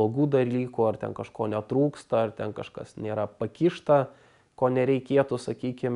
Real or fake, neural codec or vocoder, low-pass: real; none; 10.8 kHz